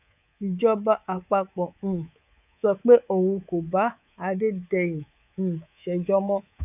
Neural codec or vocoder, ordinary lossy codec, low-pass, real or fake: codec, 24 kHz, 3.1 kbps, DualCodec; none; 3.6 kHz; fake